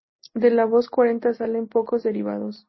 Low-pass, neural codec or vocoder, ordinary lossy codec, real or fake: 7.2 kHz; none; MP3, 24 kbps; real